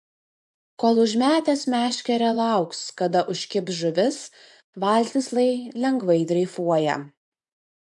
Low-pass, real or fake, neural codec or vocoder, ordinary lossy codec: 10.8 kHz; fake; vocoder, 24 kHz, 100 mel bands, Vocos; MP3, 64 kbps